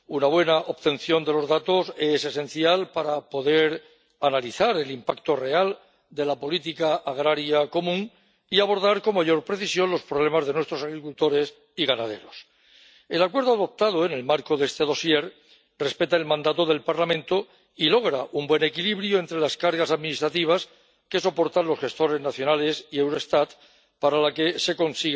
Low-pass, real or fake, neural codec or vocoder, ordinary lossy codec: none; real; none; none